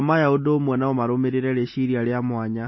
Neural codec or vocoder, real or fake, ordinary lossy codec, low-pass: none; real; MP3, 24 kbps; 7.2 kHz